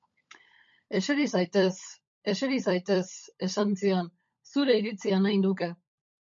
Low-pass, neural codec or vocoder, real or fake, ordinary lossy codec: 7.2 kHz; codec, 16 kHz, 16 kbps, FunCodec, trained on LibriTTS, 50 frames a second; fake; MP3, 48 kbps